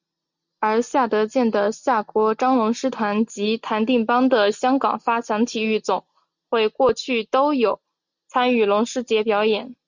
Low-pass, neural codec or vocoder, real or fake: 7.2 kHz; none; real